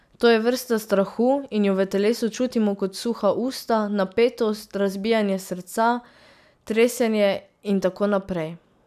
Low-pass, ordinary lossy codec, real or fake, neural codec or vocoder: 14.4 kHz; none; real; none